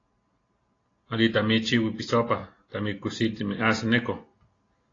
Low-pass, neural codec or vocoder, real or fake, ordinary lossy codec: 7.2 kHz; none; real; AAC, 32 kbps